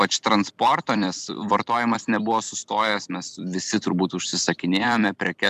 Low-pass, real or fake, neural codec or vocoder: 14.4 kHz; fake; vocoder, 44.1 kHz, 128 mel bands every 256 samples, BigVGAN v2